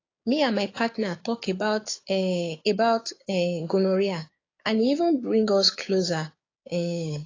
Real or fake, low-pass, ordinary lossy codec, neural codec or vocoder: fake; 7.2 kHz; AAC, 32 kbps; codec, 16 kHz, 6 kbps, DAC